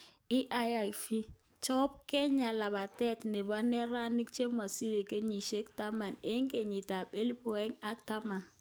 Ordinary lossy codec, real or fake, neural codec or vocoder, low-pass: none; fake; codec, 44.1 kHz, 7.8 kbps, DAC; none